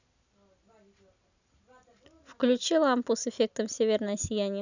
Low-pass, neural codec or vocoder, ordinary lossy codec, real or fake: 7.2 kHz; none; none; real